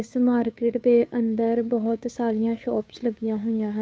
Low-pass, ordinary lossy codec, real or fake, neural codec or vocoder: none; none; fake; codec, 16 kHz, 2 kbps, FunCodec, trained on Chinese and English, 25 frames a second